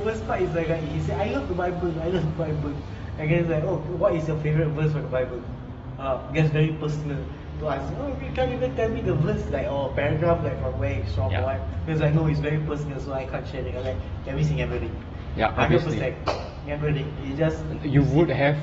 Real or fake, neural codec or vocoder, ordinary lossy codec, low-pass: real; none; AAC, 24 kbps; 10.8 kHz